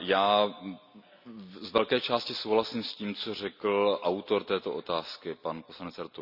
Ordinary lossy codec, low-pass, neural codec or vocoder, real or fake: none; 5.4 kHz; none; real